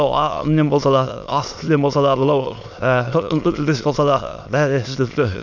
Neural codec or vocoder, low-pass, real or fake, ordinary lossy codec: autoencoder, 22.05 kHz, a latent of 192 numbers a frame, VITS, trained on many speakers; 7.2 kHz; fake; none